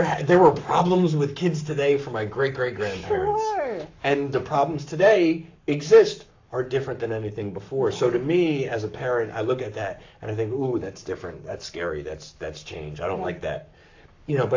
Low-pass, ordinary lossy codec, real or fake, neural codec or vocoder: 7.2 kHz; AAC, 48 kbps; fake; codec, 44.1 kHz, 7.8 kbps, Pupu-Codec